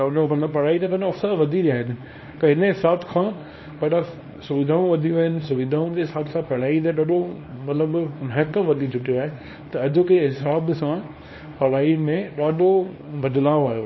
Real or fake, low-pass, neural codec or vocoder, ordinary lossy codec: fake; 7.2 kHz; codec, 24 kHz, 0.9 kbps, WavTokenizer, small release; MP3, 24 kbps